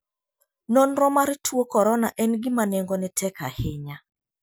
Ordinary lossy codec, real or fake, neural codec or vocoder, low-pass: none; real; none; none